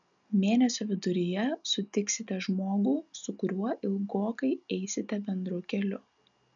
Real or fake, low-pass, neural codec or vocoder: real; 7.2 kHz; none